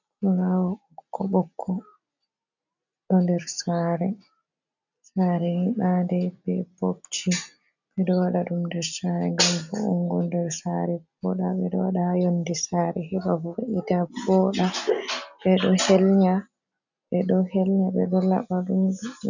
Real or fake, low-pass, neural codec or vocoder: real; 7.2 kHz; none